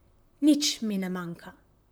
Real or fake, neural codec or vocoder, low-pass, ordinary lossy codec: fake; vocoder, 44.1 kHz, 128 mel bands, Pupu-Vocoder; none; none